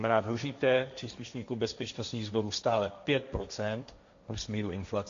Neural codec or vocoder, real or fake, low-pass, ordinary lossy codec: codec, 16 kHz, 1.1 kbps, Voila-Tokenizer; fake; 7.2 kHz; MP3, 64 kbps